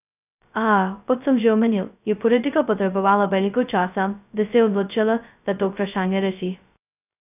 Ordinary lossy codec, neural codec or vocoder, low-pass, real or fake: none; codec, 16 kHz, 0.2 kbps, FocalCodec; 3.6 kHz; fake